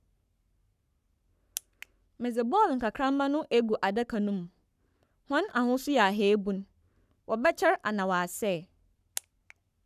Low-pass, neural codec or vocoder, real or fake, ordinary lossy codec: 14.4 kHz; codec, 44.1 kHz, 7.8 kbps, Pupu-Codec; fake; none